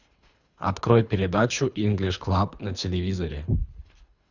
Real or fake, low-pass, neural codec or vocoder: fake; 7.2 kHz; codec, 24 kHz, 3 kbps, HILCodec